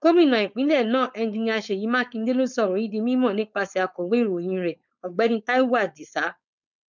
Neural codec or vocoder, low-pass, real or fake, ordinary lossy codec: codec, 16 kHz, 4.8 kbps, FACodec; 7.2 kHz; fake; none